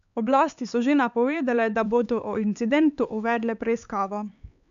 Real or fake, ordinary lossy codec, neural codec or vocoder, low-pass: fake; none; codec, 16 kHz, 4 kbps, X-Codec, HuBERT features, trained on LibriSpeech; 7.2 kHz